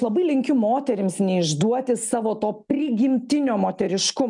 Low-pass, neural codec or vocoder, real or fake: 10.8 kHz; none; real